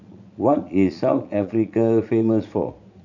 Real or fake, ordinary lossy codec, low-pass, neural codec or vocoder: fake; none; 7.2 kHz; vocoder, 44.1 kHz, 80 mel bands, Vocos